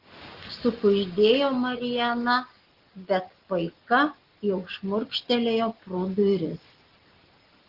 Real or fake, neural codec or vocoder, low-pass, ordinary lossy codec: real; none; 5.4 kHz; Opus, 16 kbps